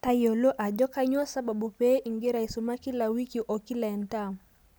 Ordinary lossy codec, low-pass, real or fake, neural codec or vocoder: none; none; real; none